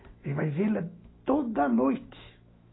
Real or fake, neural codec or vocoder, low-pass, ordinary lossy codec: fake; autoencoder, 48 kHz, 128 numbers a frame, DAC-VAE, trained on Japanese speech; 7.2 kHz; AAC, 16 kbps